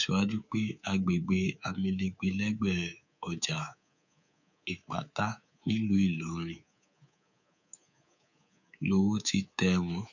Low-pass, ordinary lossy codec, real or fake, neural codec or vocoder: 7.2 kHz; none; fake; codec, 24 kHz, 3.1 kbps, DualCodec